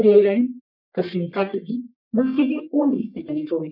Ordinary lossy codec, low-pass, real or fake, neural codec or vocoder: none; 5.4 kHz; fake; codec, 44.1 kHz, 1.7 kbps, Pupu-Codec